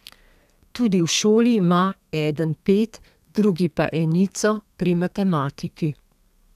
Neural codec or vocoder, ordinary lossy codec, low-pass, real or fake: codec, 32 kHz, 1.9 kbps, SNAC; none; 14.4 kHz; fake